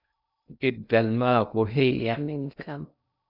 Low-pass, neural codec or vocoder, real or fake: 5.4 kHz; codec, 16 kHz in and 24 kHz out, 0.6 kbps, FocalCodec, streaming, 2048 codes; fake